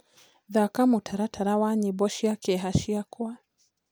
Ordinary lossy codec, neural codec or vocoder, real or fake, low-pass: none; none; real; none